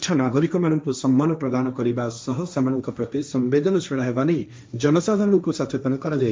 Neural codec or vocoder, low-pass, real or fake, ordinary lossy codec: codec, 16 kHz, 1.1 kbps, Voila-Tokenizer; none; fake; none